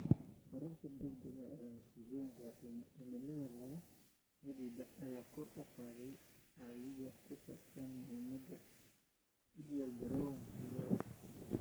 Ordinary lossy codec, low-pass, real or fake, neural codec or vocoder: none; none; fake; codec, 44.1 kHz, 3.4 kbps, Pupu-Codec